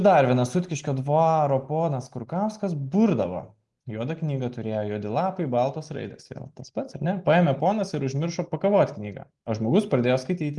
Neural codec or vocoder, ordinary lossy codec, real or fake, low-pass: none; Opus, 24 kbps; real; 10.8 kHz